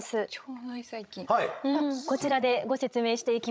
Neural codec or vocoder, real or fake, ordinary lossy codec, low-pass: codec, 16 kHz, 16 kbps, FreqCodec, larger model; fake; none; none